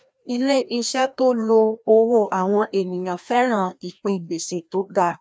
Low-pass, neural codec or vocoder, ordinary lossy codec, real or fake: none; codec, 16 kHz, 1 kbps, FreqCodec, larger model; none; fake